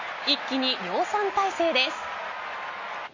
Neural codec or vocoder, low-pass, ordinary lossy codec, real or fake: none; 7.2 kHz; MP3, 32 kbps; real